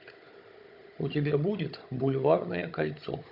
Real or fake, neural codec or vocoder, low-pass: fake; codec, 16 kHz, 16 kbps, FunCodec, trained on Chinese and English, 50 frames a second; 5.4 kHz